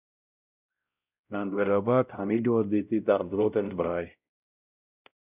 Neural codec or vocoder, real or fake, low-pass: codec, 16 kHz, 0.5 kbps, X-Codec, WavLM features, trained on Multilingual LibriSpeech; fake; 3.6 kHz